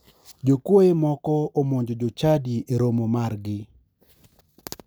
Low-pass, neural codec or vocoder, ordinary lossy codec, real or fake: none; none; none; real